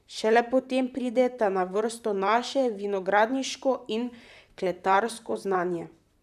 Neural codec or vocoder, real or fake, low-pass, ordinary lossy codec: vocoder, 44.1 kHz, 128 mel bands, Pupu-Vocoder; fake; 14.4 kHz; none